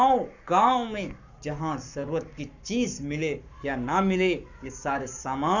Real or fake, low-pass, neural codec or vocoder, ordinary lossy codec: fake; 7.2 kHz; codec, 44.1 kHz, 7.8 kbps, Pupu-Codec; none